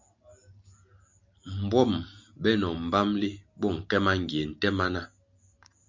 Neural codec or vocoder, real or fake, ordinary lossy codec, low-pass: none; real; MP3, 64 kbps; 7.2 kHz